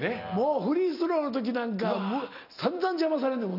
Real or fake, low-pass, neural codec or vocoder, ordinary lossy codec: real; 5.4 kHz; none; none